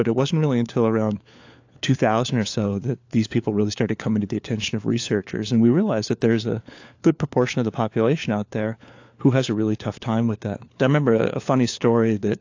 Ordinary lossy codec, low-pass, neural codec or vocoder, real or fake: AAC, 48 kbps; 7.2 kHz; codec, 16 kHz, 4 kbps, FunCodec, trained on LibriTTS, 50 frames a second; fake